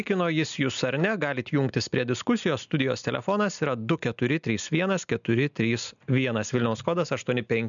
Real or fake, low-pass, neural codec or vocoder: real; 7.2 kHz; none